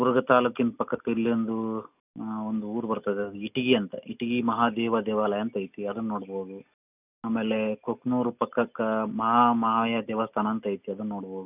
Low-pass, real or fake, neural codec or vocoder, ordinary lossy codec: 3.6 kHz; real; none; none